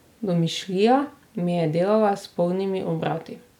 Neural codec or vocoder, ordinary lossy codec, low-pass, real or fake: none; none; 19.8 kHz; real